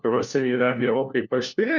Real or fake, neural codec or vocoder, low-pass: fake; codec, 16 kHz, 1 kbps, FunCodec, trained on LibriTTS, 50 frames a second; 7.2 kHz